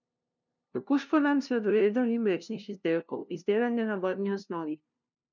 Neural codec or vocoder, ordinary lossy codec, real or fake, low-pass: codec, 16 kHz, 0.5 kbps, FunCodec, trained on LibriTTS, 25 frames a second; none; fake; 7.2 kHz